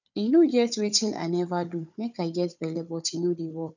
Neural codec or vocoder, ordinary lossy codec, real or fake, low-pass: codec, 16 kHz, 4 kbps, FunCodec, trained on Chinese and English, 50 frames a second; none; fake; 7.2 kHz